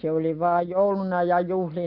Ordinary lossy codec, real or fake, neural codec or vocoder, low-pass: none; real; none; 5.4 kHz